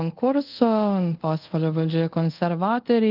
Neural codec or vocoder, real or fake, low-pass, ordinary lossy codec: codec, 24 kHz, 0.5 kbps, DualCodec; fake; 5.4 kHz; Opus, 32 kbps